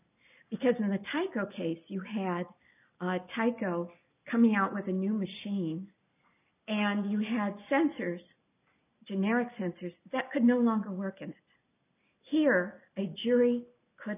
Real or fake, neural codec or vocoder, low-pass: real; none; 3.6 kHz